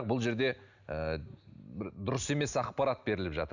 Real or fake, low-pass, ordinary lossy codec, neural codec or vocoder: real; 7.2 kHz; none; none